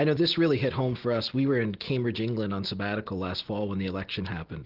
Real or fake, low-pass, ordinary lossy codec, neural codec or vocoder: real; 5.4 kHz; Opus, 16 kbps; none